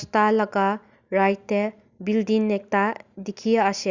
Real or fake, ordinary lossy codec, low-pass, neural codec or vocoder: real; Opus, 64 kbps; 7.2 kHz; none